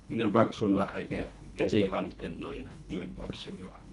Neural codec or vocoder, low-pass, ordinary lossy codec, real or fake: codec, 24 kHz, 1.5 kbps, HILCodec; 10.8 kHz; none; fake